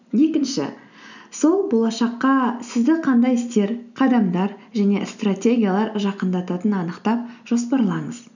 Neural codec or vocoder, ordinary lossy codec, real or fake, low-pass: none; none; real; 7.2 kHz